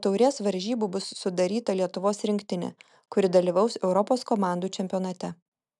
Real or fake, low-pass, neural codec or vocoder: real; 10.8 kHz; none